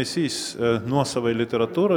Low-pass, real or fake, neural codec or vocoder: 19.8 kHz; real; none